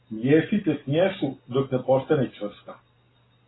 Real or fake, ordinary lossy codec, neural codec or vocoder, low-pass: real; AAC, 16 kbps; none; 7.2 kHz